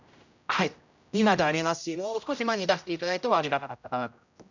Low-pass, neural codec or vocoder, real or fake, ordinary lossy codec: 7.2 kHz; codec, 16 kHz, 0.5 kbps, X-Codec, HuBERT features, trained on general audio; fake; none